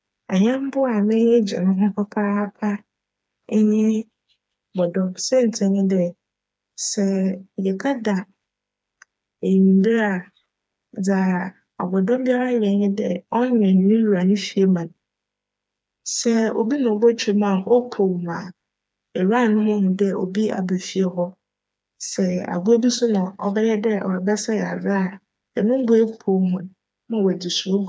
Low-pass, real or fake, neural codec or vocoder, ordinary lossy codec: none; fake; codec, 16 kHz, 4 kbps, FreqCodec, smaller model; none